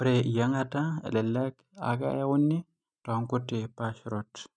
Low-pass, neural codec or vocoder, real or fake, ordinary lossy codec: 9.9 kHz; none; real; none